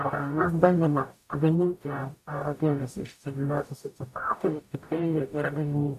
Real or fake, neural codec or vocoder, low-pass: fake; codec, 44.1 kHz, 0.9 kbps, DAC; 14.4 kHz